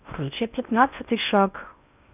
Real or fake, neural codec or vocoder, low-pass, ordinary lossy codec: fake; codec, 16 kHz in and 24 kHz out, 0.6 kbps, FocalCodec, streaming, 4096 codes; 3.6 kHz; none